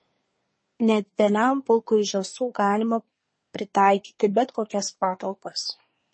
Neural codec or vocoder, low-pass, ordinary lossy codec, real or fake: codec, 24 kHz, 1 kbps, SNAC; 9.9 kHz; MP3, 32 kbps; fake